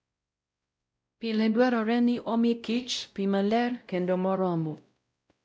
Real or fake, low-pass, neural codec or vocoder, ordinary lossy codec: fake; none; codec, 16 kHz, 0.5 kbps, X-Codec, WavLM features, trained on Multilingual LibriSpeech; none